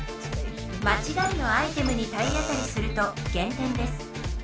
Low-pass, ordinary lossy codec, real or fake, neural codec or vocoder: none; none; real; none